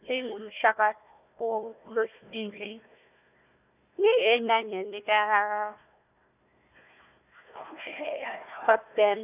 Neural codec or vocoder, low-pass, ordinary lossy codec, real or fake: codec, 16 kHz, 1 kbps, FunCodec, trained on Chinese and English, 50 frames a second; 3.6 kHz; none; fake